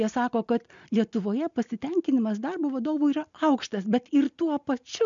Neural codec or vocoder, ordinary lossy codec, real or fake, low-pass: none; MP3, 64 kbps; real; 7.2 kHz